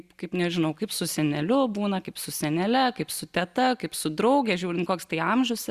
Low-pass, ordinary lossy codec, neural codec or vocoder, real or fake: 14.4 kHz; Opus, 64 kbps; none; real